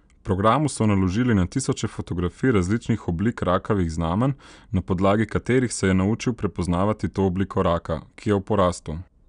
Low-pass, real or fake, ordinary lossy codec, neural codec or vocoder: 9.9 kHz; real; none; none